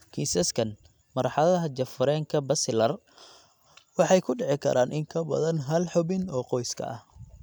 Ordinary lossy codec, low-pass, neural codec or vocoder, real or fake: none; none; none; real